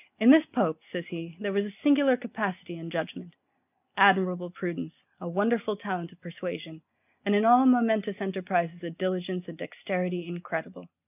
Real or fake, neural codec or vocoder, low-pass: real; none; 3.6 kHz